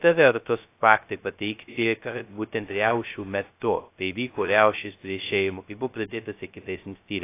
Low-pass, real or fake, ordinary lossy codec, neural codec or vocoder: 3.6 kHz; fake; AAC, 24 kbps; codec, 16 kHz, 0.2 kbps, FocalCodec